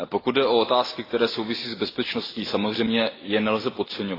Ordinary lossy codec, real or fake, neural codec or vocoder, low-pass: AAC, 24 kbps; real; none; 5.4 kHz